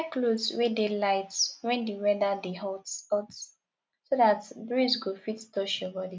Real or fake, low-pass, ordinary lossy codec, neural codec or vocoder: real; none; none; none